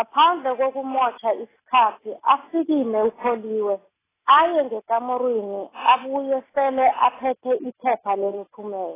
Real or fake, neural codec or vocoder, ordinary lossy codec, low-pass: real; none; AAC, 16 kbps; 3.6 kHz